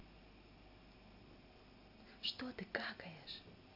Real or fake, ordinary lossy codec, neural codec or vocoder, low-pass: real; AAC, 48 kbps; none; 5.4 kHz